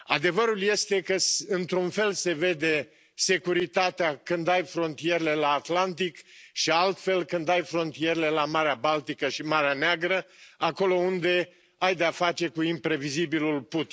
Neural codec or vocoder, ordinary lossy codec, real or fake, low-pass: none; none; real; none